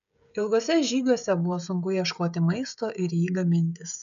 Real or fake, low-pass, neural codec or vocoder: fake; 7.2 kHz; codec, 16 kHz, 16 kbps, FreqCodec, smaller model